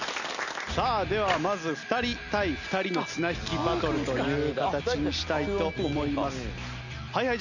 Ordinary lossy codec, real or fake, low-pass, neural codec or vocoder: none; real; 7.2 kHz; none